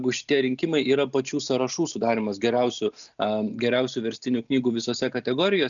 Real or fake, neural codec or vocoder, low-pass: real; none; 7.2 kHz